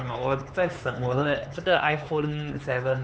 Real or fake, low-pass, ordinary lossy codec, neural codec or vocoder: fake; none; none; codec, 16 kHz, 4 kbps, X-Codec, HuBERT features, trained on LibriSpeech